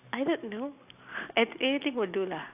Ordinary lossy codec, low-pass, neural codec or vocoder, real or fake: AAC, 32 kbps; 3.6 kHz; none; real